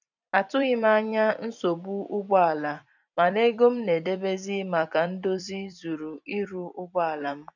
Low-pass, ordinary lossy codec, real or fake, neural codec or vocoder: 7.2 kHz; none; fake; vocoder, 44.1 kHz, 128 mel bands every 256 samples, BigVGAN v2